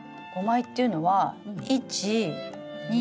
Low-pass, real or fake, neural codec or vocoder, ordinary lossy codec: none; real; none; none